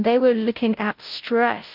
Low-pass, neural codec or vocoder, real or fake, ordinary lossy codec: 5.4 kHz; codec, 16 kHz, 0.5 kbps, FunCodec, trained on Chinese and English, 25 frames a second; fake; Opus, 32 kbps